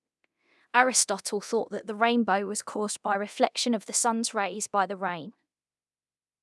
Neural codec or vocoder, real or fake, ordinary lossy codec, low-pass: codec, 24 kHz, 0.9 kbps, DualCodec; fake; none; none